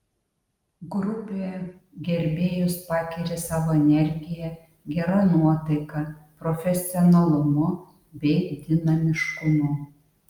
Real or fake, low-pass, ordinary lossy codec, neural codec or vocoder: real; 19.8 kHz; Opus, 32 kbps; none